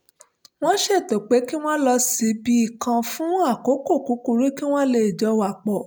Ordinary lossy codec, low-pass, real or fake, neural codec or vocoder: none; none; real; none